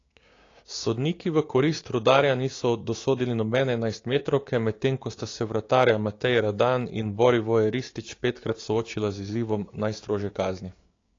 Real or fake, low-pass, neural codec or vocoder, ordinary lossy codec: fake; 7.2 kHz; codec, 16 kHz, 6 kbps, DAC; AAC, 32 kbps